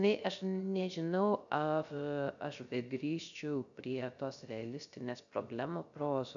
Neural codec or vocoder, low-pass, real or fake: codec, 16 kHz, 0.3 kbps, FocalCodec; 7.2 kHz; fake